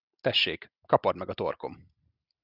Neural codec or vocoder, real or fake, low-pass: none; real; 5.4 kHz